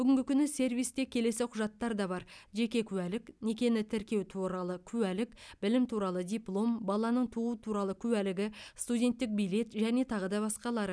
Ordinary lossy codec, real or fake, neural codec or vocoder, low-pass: none; real; none; none